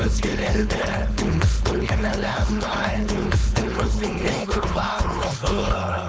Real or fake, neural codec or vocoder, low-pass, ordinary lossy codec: fake; codec, 16 kHz, 4.8 kbps, FACodec; none; none